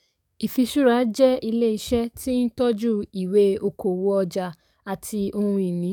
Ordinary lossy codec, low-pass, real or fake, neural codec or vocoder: none; 19.8 kHz; fake; codec, 44.1 kHz, 7.8 kbps, DAC